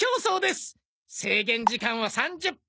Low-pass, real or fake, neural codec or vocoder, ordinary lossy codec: none; real; none; none